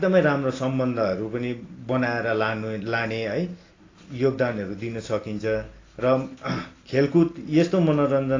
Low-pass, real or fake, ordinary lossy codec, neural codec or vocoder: 7.2 kHz; real; AAC, 32 kbps; none